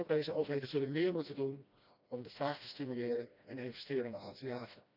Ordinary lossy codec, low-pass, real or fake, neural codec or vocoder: none; 5.4 kHz; fake; codec, 16 kHz, 1 kbps, FreqCodec, smaller model